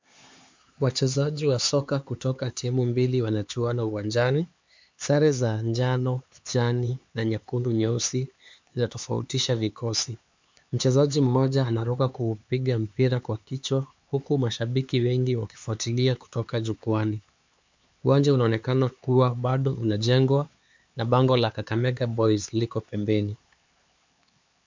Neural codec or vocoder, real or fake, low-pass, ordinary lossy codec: codec, 16 kHz, 4 kbps, X-Codec, HuBERT features, trained on LibriSpeech; fake; 7.2 kHz; MP3, 64 kbps